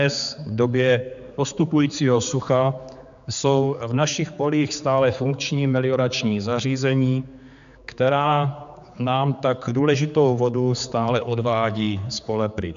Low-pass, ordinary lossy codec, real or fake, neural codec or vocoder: 7.2 kHz; AAC, 96 kbps; fake; codec, 16 kHz, 4 kbps, X-Codec, HuBERT features, trained on general audio